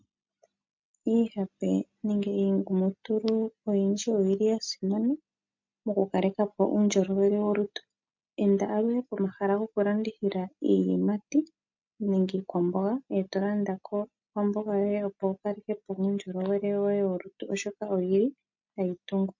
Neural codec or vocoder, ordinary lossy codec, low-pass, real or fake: none; MP3, 48 kbps; 7.2 kHz; real